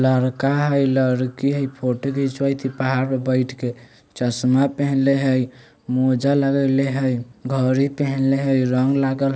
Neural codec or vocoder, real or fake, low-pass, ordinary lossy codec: none; real; none; none